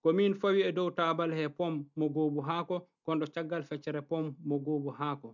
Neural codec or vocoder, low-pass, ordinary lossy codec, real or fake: none; 7.2 kHz; none; real